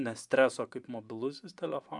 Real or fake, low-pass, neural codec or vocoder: fake; 10.8 kHz; vocoder, 24 kHz, 100 mel bands, Vocos